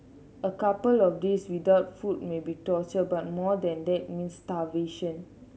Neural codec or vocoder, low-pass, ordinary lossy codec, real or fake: none; none; none; real